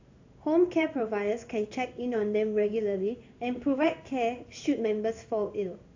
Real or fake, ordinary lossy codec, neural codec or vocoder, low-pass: fake; AAC, 48 kbps; codec, 16 kHz in and 24 kHz out, 1 kbps, XY-Tokenizer; 7.2 kHz